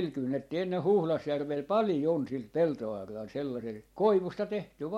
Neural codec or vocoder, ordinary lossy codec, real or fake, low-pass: none; MP3, 64 kbps; real; 19.8 kHz